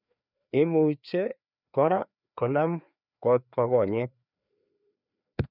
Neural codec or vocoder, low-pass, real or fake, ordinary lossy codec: codec, 16 kHz, 2 kbps, FreqCodec, larger model; 5.4 kHz; fake; none